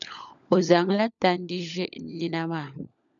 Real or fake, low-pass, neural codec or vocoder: fake; 7.2 kHz; codec, 16 kHz, 16 kbps, FunCodec, trained on LibriTTS, 50 frames a second